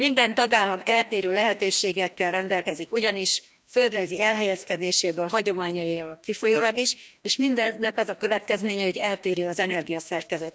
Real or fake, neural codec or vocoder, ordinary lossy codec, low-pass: fake; codec, 16 kHz, 1 kbps, FreqCodec, larger model; none; none